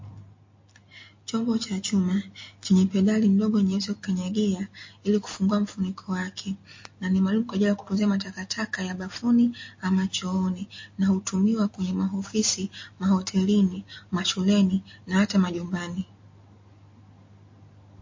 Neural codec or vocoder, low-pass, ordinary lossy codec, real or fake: none; 7.2 kHz; MP3, 32 kbps; real